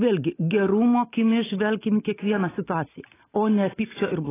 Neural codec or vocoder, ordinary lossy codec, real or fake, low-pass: none; AAC, 16 kbps; real; 3.6 kHz